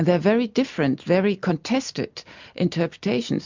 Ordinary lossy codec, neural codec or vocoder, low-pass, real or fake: MP3, 64 kbps; none; 7.2 kHz; real